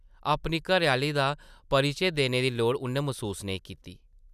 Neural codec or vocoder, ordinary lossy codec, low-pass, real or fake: none; none; 14.4 kHz; real